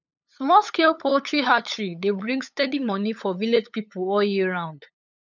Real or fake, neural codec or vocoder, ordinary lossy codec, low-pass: fake; codec, 16 kHz, 8 kbps, FunCodec, trained on LibriTTS, 25 frames a second; none; 7.2 kHz